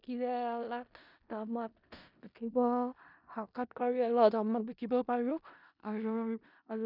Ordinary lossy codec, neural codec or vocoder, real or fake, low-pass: none; codec, 16 kHz in and 24 kHz out, 0.4 kbps, LongCat-Audio-Codec, four codebook decoder; fake; 5.4 kHz